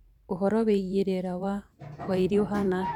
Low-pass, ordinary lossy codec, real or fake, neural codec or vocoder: 19.8 kHz; none; fake; vocoder, 44.1 kHz, 128 mel bands every 512 samples, BigVGAN v2